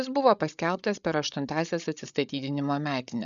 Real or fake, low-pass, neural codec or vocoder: fake; 7.2 kHz; codec, 16 kHz, 8 kbps, FreqCodec, larger model